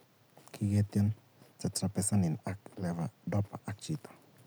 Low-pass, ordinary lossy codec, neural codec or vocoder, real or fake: none; none; none; real